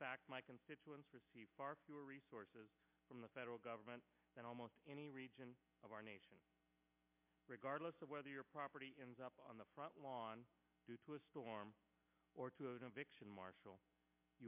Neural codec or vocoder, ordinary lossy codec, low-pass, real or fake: none; MP3, 32 kbps; 3.6 kHz; real